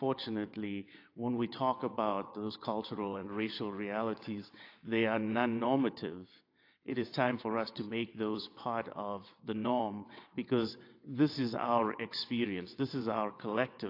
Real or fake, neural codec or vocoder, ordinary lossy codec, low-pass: fake; vocoder, 22.05 kHz, 80 mel bands, WaveNeXt; AAC, 32 kbps; 5.4 kHz